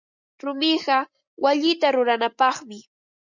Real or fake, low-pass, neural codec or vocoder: real; 7.2 kHz; none